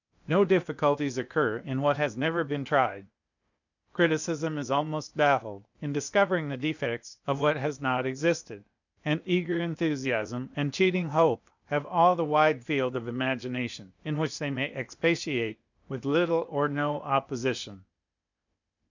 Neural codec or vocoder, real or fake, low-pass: codec, 16 kHz, 0.8 kbps, ZipCodec; fake; 7.2 kHz